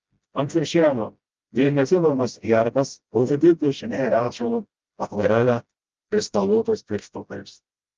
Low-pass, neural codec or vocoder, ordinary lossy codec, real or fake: 7.2 kHz; codec, 16 kHz, 0.5 kbps, FreqCodec, smaller model; Opus, 24 kbps; fake